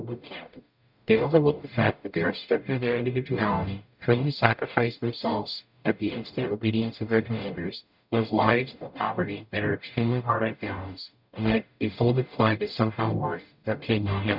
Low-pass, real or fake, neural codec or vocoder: 5.4 kHz; fake; codec, 44.1 kHz, 0.9 kbps, DAC